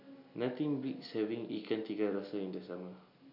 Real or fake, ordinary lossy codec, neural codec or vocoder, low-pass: real; none; none; 5.4 kHz